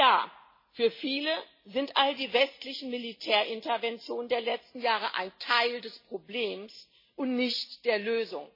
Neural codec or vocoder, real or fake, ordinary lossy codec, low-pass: none; real; MP3, 24 kbps; 5.4 kHz